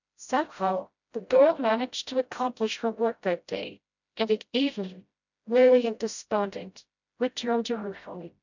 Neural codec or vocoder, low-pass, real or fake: codec, 16 kHz, 0.5 kbps, FreqCodec, smaller model; 7.2 kHz; fake